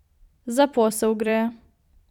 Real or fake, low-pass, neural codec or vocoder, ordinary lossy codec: fake; 19.8 kHz; vocoder, 44.1 kHz, 128 mel bands every 256 samples, BigVGAN v2; none